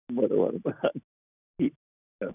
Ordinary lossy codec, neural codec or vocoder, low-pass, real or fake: none; none; 3.6 kHz; real